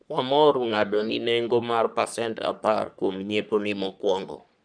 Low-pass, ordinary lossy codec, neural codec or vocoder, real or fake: 9.9 kHz; none; codec, 44.1 kHz, 3.4 kbps, Pupu-Codec; fake